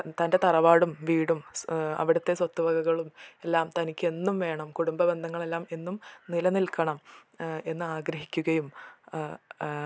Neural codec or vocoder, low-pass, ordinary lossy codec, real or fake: none; none; none; real